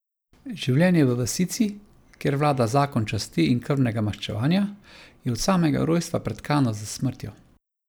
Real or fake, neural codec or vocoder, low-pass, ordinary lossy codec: real; none; none; none